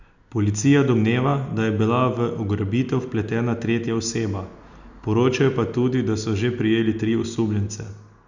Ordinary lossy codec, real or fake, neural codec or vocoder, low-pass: Opus, 64 kbps; real; none; 7.2 kHz